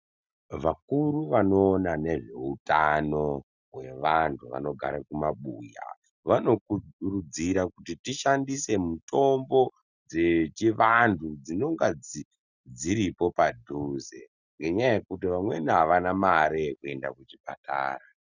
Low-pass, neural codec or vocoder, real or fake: 7.2 kHz; none; real